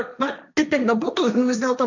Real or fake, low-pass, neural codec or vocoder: fake; 7.2 kHz; codec, 16 kHz, 1.1 kbps, Voila-Tokenizer